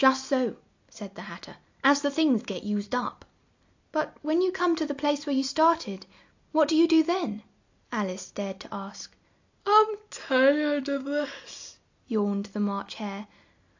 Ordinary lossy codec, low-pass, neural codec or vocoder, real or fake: AAC, 48 kbps; 7.2 kHz; none; real